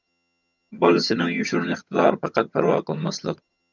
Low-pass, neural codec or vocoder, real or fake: 7.2 kHz; vocoder, 22.05 kHz, 80 mel bands, HiFi-GAN; fake